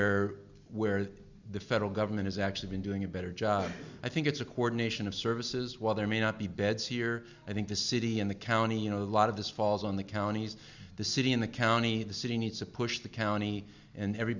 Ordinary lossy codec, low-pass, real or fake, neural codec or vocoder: Opus, 64 kbps; 7.2 kHz; real; none